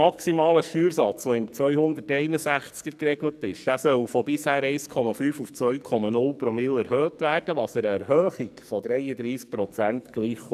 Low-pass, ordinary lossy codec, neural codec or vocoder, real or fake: 14.4 kHz; none; codec, 44.1 kHz, 2.6 kbps, SNAC; fake